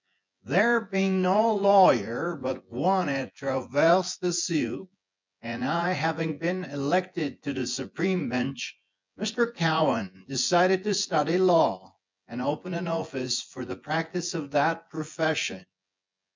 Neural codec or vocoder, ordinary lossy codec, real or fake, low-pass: vocoder, 24 kHz, 100 mel bands, Vocos; MP3, 64 kbps; fake; 7.2 kHz